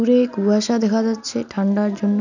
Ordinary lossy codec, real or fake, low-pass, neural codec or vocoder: none; real; 7.2 kHz; none